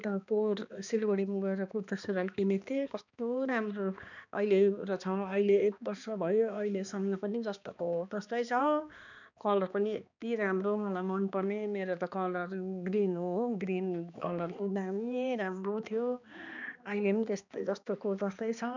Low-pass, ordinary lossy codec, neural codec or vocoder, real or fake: 7.2 kHz; none; codec, 16 kHz, 2 kbps, X-Codec, HuBERT features, trained on balanced general audio; fake